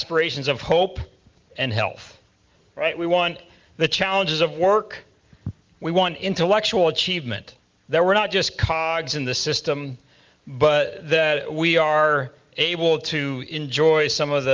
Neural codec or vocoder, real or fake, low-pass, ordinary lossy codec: none; real; 7.2 kHz; Opus, 32 kbps